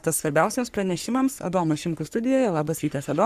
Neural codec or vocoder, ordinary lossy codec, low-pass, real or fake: codec, 44.1 kHz, 3.4 kbps, Pupu-Codec; Opus, 64 kbps; 14.4 kHz; fake